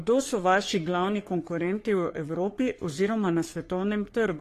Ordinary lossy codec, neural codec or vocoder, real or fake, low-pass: AAC, 48 kbps; codec, 44.1 kHz, 3.4 kbps, Pupu-Codec; fake; 14.4 kHz